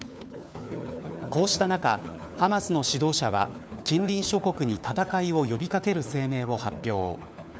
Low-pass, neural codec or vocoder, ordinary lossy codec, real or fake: none; codec, 16 kHz, 4 kbps, FunCodec, trained on LibriTTS, 50 frames a second; none; fake